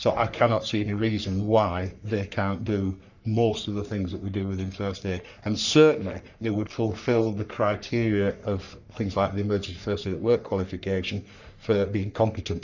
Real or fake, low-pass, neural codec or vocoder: fake; 7.2 kHz; codec, 44.1 kHz, 3.4 kbps, Pupu-Codec